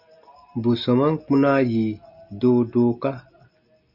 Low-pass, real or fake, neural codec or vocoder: 5.4 kHz; real; none